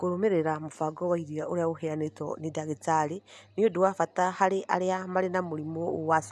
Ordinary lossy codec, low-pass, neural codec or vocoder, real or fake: none; none; none; real